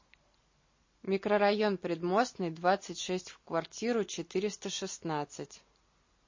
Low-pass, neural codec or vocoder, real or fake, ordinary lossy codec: 7.2 kHz; none; real; MP3, 32 kbps